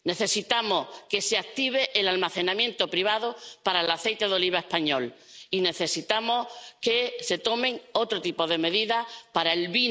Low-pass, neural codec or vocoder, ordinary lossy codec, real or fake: none; none; none; real